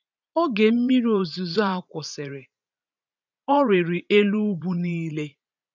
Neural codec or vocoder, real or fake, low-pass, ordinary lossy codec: vocoder, 44.1 kHz, 80 mel bands, Vocos; fake; 7.2 kHz; none